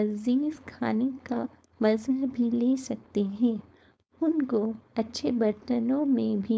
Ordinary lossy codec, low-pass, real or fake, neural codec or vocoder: none; none; fake; codec, 16 kHz, 4.8 kbps, FACodec